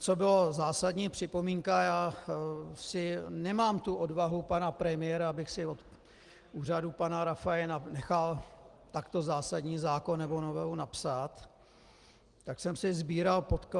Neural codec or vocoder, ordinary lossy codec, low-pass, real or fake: none; Opus, 24 kbps; 10.8 kHz; real